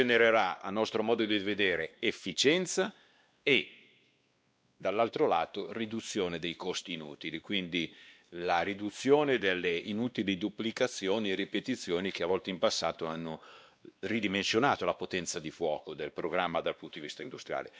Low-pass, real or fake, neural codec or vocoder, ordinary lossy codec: none; fake; codec, 16 kHz, 2 kbps, X-Codec, WavLM features, trained on Multilingual LibriSpeech; none